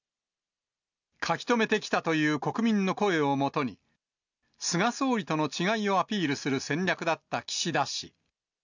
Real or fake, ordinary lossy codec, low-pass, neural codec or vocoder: real; none; 7.2 kHz; none